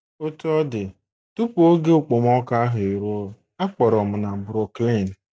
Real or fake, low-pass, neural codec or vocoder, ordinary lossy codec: real; none; none; none